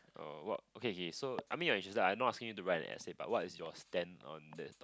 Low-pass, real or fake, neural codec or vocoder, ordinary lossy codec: none; real; none; none